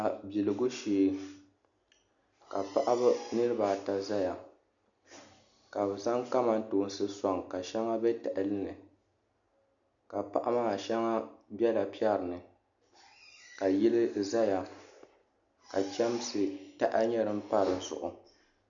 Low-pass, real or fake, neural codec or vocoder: 7.2 kHz; real; none